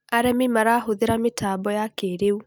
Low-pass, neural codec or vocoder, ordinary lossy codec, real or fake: none; none; none; real